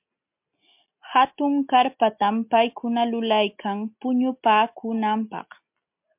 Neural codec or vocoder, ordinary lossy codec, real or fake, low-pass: none; MP3, 32 kbps; real; 3.6 kHz